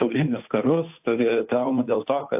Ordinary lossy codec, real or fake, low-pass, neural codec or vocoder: AAC, 32 kbps; fake; 3.6 kHz; codec, 16 kHz, 2 kbps, FunCodec, trained on Chinese and English, 25 frames a second